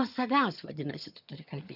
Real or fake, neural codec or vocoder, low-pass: fake; vocoder, 22.05 kHz, 80 mel bands, HiFi-GAN; 5.4 kHz